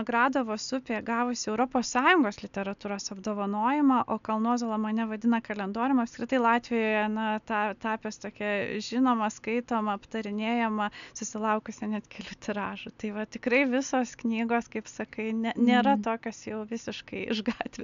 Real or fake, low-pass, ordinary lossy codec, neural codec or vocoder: real; 7.2 kHz; MP3, 96 kbps; none